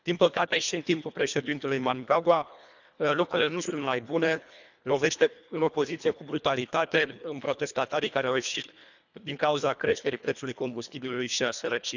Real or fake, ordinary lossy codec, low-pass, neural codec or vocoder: fake; none; 7.2 kHz; codec, 24 kHz, 1.5 kbps, HILCodec